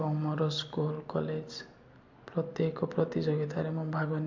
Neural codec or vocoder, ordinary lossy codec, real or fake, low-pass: none; MP3, 48 kbps; real; 7.2 kHz